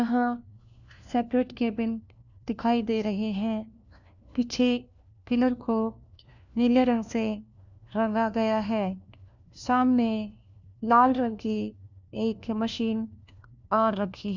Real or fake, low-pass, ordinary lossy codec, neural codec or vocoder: fake; 7.2 kHz; none; codec, 16 kHz, 1 kbps, FunCodec, trained on LibriTTS, 50 frames a second